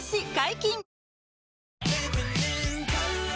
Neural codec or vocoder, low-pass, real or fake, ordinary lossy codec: none; none; real; none